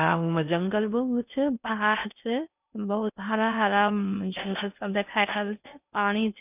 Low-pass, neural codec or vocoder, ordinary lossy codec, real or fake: 3.6 kHz; codec, 16 kHz in and 24 kHz out, 0.6 kbps, FocalCodec, streaming, 4096 codes; none; fake